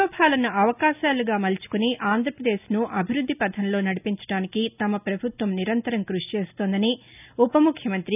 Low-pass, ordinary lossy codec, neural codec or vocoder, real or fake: 3.6 kHz; none; none; real